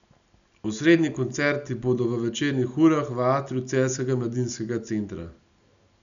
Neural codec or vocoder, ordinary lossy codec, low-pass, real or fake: none; MP3, 96 kbps; 7.2 kHz; real